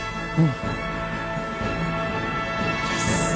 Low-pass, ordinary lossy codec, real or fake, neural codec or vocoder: none; none; real; none